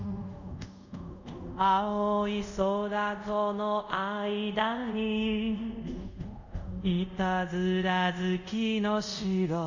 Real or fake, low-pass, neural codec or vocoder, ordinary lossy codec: fake; 7.2 kHz; codec, 24 kHz, 0.5 kbps, DualCodec; none